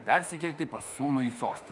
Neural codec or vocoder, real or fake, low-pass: autoencoder, 48 kHz, 32 numbers a frame, DAC-VAE, trained on Japanese speech; fake; 10.8 kHz